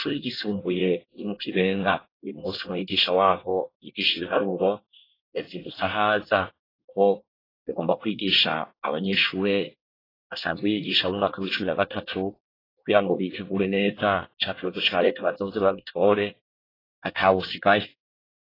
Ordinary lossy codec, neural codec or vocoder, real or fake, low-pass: AAC, 24 kbps; codec, 24 kHz, 1 kbps, SNAC; fake; 5.4 kHz